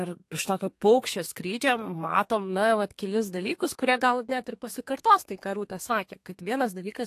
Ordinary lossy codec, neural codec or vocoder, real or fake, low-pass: AAC, 64 kbps; codec, 32 kHz, 1.9 kbps, SNAC; fake; 14.4 kHz